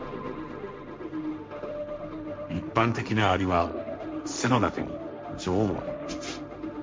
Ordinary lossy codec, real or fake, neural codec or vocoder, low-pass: none; fake; codec, 16 kHz, 1.1 kbps, Voila-Tokenizer; none